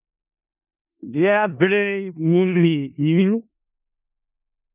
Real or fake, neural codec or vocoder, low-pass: fake; codec, 16 kHz in and 24 kHz out, 0.4 kbps, LongCat-Audio-Codec, four codebook decoder; 3.6 kHz